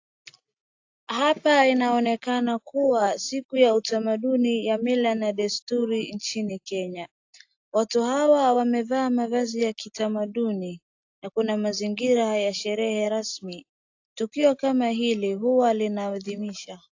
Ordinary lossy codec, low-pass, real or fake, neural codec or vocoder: AAC, 48 kbps; 7.2 kHz; real; none